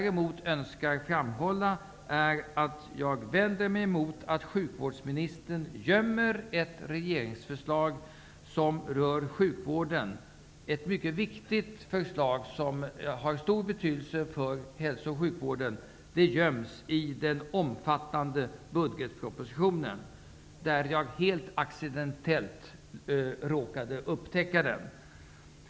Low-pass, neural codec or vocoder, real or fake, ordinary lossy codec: none; none; real; none